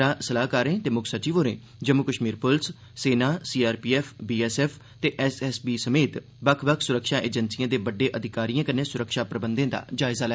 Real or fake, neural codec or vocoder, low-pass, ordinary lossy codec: real; none; none; none